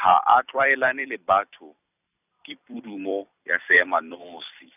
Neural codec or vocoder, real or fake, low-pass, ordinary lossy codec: vocoder, 44.1 kHz, 128 mel bands every 256 samples, BigVGAN v2; fake; 3.6 kHz; none